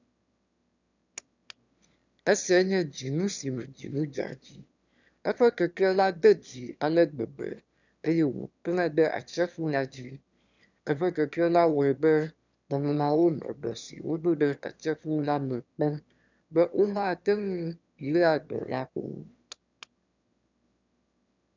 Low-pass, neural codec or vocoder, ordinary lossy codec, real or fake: 7.2 kHz; autoencoder, 22.05 kHz, a latent of 192 numbers a frame, VITS, trained on one speaker; none; fake